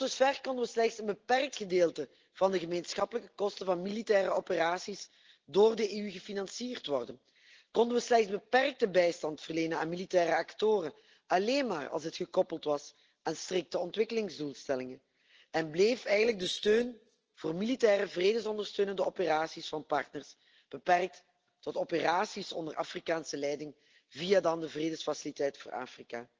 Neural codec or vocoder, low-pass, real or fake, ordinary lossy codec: none; 7.2 kHz; real; Opus, 16 kbps